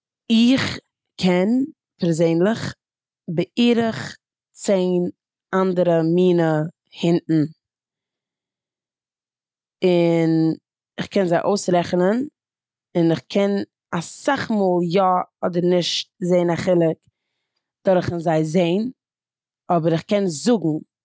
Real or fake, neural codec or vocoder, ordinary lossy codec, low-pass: real; none; none; none